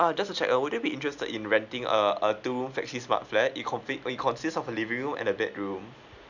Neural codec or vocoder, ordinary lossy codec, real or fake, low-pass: none; none; real; 7.2 kHz